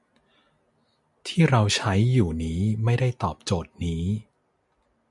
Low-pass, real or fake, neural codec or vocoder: 10.8 kHz; real; none